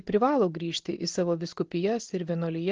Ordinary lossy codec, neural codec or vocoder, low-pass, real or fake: Opus, 16 kbps; none; 7.2 kHz; real